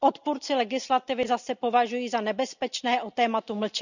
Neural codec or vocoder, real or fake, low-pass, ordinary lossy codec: none; real; 7.2 kHz; none